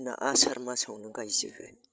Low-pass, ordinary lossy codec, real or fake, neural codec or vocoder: none; none; fake; codec, 16 kHz, 16 kbps, FreqCodec, larger model